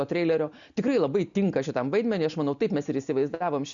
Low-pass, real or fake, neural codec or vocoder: 7.2 kHz; real; none